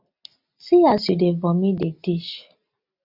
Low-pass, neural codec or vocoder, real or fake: 5.4 kHz; none; real